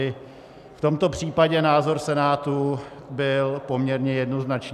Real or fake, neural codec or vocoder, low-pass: real; none; 14.4 kHz